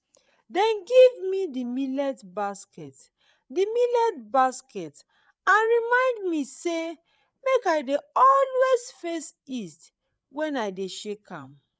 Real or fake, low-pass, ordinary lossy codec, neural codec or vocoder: fake; none; none; codec, 16 kHz, 8 kbps, FreqCodec, larger model